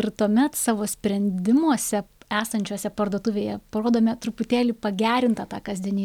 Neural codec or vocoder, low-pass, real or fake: none; 19.8 kHz; real